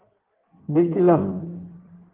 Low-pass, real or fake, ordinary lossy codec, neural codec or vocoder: 3.6 kHz; fake; Opus, 16 kbps; codec, 16 kHz in and 24 kHz out, 1.1 kbps, FireRedTTS-2 codec